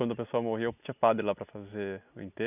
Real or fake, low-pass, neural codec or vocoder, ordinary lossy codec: real; 3.6 kHz; none; none